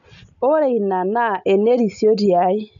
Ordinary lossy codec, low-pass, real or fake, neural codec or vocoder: MP3, 96 kbps; 7.2 kHz; real; none